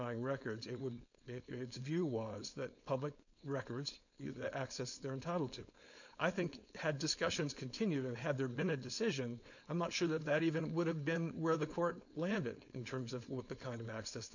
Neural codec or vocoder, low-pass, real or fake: codec, 16 kHz, 4.8 kbps, FACodec; 7.2 kHz; fake